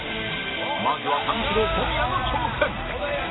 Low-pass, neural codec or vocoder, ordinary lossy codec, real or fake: 7.2 kHz; none; AAC, 16 kbps; real